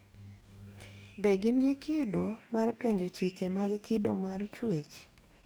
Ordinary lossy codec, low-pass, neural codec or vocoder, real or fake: none; none; codec, 44.1 kHz, 2.6 kbps, DAC; fake